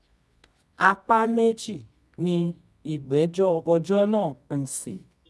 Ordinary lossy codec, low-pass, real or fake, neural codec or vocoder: none; none; fake; codec, 24 kHz, 0.9 kbps, WavTokenizer, medium music audio release